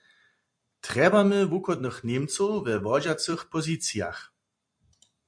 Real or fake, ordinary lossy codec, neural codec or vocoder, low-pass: fake; MP3, 64 kbps; vocoder, 44.1 kHz, 128 mel bands every 256 samples, BigVGAN v2; 9.9 kHz